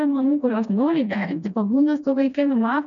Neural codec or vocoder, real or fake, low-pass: codec, 16 kHz, 1 kbps, FreqCodec, smaller model; fake; 7.2 kHz